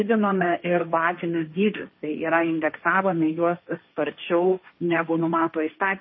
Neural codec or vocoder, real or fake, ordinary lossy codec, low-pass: codec, 16 kHz, 1.1 kbps, Voila-Tokenizer; fake; MP3, 24 kbps; 7.2 kHz